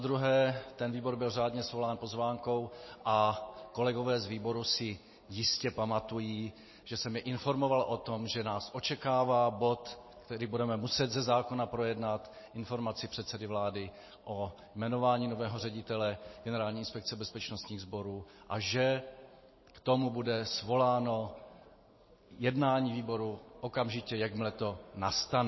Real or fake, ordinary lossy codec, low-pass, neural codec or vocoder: real; MP3, 24 kbps; 7.2 kHz; none